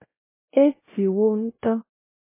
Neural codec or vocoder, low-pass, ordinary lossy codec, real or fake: codec, 16 kHz, 0.5 kbps, X-Codec, WavLM features, trained on Multilingual LibriSpeech; 3.6 kHz; MP3, 16 kbps; fake